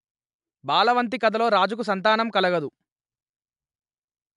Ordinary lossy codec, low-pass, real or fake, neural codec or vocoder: AAC, 96 kbps; 10.8 kHz; real; none